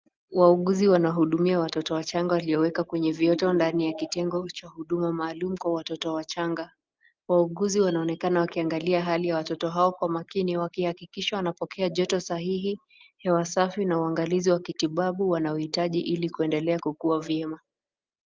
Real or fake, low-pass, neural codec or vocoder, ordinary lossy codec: real; 7.2 kHz; none; Opus, 32 kbps